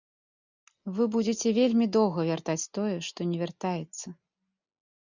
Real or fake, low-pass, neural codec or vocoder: real; 7.2 kHz; none